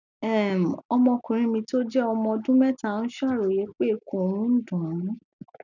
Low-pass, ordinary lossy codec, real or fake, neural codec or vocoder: 7.2 kHz; none; real; none